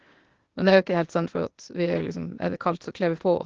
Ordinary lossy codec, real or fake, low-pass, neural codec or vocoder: Opus, 16 kbps; fake; 7.2 kHz; codec, 16 kHz, 0.8 kbps, ZipCodec